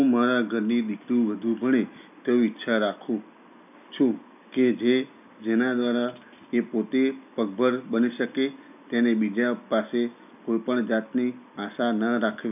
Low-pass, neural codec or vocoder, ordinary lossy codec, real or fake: 3.6 kHz; none; none; real